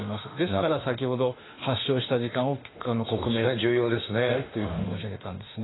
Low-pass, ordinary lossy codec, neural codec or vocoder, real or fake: 7.2 kHz; AAC, 16 kbps; autoencoder, 48 kHz, 32 numbers a frame, DAC-VAE, trained on Japanese speech; fake